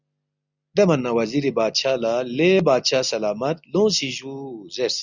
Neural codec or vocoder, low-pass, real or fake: none; 7.2 kHz; real